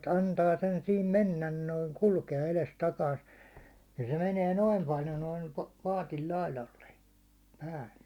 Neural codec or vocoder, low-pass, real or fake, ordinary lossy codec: none; 19.8 kHz; real; Opus, 64 kbps